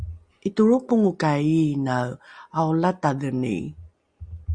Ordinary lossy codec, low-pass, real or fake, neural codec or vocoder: Opus, 64 kbps; 9.9 kHz; real; none